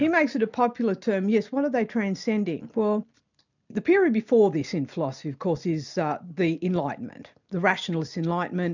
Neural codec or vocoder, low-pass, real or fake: none; 7.2 kHz; real